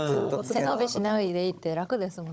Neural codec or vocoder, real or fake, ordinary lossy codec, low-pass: codec, 16 kHz, 16 kbps, FunCodec, trained on Chinese and English, 50 frames a second; fake; none; none